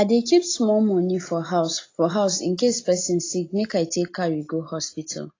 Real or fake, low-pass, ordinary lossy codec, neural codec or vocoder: real; 7.2 kHz; AAC, 32 kbps; none